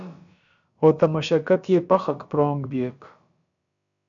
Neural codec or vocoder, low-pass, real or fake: codec, 16 kHz, about 1 kbps, DyCAST, with the encoder's durations; 7.2 kHz; fake